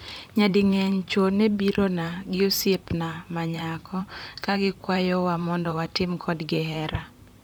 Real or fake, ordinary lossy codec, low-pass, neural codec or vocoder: fake; none; none; vocoder, 44.1 kHz, 128 mel bands, Pupu-Vocoder